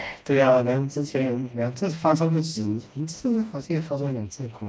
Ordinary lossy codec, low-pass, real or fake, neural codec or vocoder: none; none; fake; codec, 16 kHz, 1 kbps, FreqCodec, smaller model